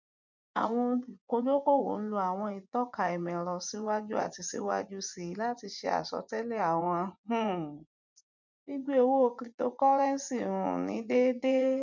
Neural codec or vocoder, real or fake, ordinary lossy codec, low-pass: vocoder, 24 kHz, 100 mel bands, Vocos; fake; none; 7.2 kHz